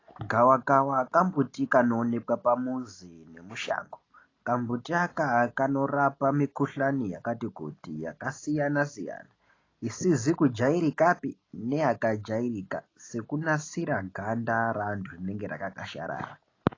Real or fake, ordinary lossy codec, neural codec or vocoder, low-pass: real; AAC, 32 kbps; none; 7.2 kHz